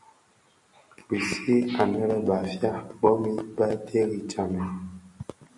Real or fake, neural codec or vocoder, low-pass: real; none; 10.8 kHz